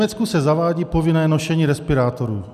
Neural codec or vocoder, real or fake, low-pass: none; real; 14.4 kHz